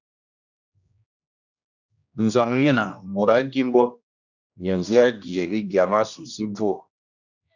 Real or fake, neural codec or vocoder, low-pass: fake; codec, 16 kHz, 1 kbps, X-Codec, HuBERT features, trained on general audio; 7.2 kHz